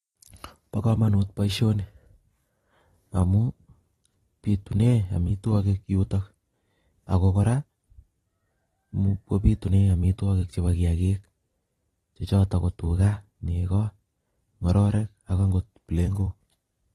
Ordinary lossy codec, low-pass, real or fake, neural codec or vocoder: AAC, 32 kbps; 19.8 kHz; fake; vocoder, 44.1 kHz, 128 mel bands every 256 samples, BigVGAN v2